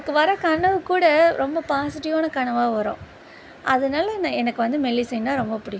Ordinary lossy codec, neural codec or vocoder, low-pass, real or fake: none; none; none; real